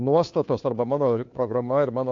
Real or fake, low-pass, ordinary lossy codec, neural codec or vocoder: fake; 7.2 kHz; AAC, 48 kbps; codec, 16 kHz, 2 kbps, FunCodec, trained on Chinese and English, 25 frames a second